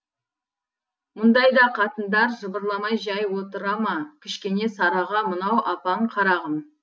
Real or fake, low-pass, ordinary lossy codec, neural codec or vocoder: real; none; none; none